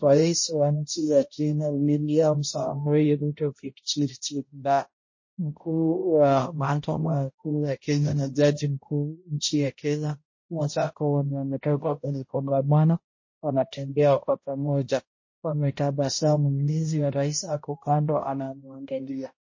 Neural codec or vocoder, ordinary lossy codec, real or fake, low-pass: codec, 16 kHz, 0.5 kbps, X-Codec, HuBERT features, trained on balanced general audio; MP3, 32 kbps; fake; 7.2 kHz